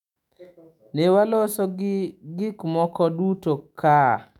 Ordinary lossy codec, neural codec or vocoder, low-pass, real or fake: none; autoencoder, 48 kHz, 128 numbers a frame, DAC-VAE, trained on Japanese speech; 19.8 kHz; fake